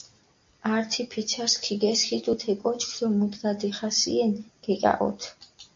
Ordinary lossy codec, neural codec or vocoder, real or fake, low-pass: MP3, 48 kbps; none; real; 7.2 kHz